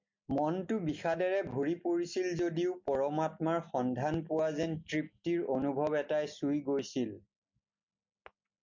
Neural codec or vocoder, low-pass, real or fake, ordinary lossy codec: none; 7.2 kHz; real; MP3, 48 kbps